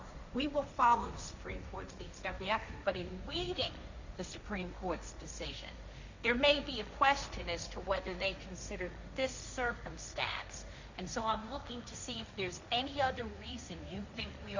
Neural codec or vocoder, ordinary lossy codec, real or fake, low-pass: codec, 16 kHz, 1.1 kbps, Voila-Tokenizer; Opus, 64 kbps; fake; 7.2 kHz